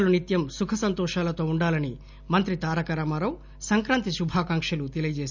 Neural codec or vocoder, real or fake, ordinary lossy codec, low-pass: none; real; none; 7.2 kHz